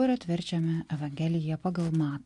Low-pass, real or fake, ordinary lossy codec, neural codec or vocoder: 10.8 kHz; real; MP3, 96 kbps; none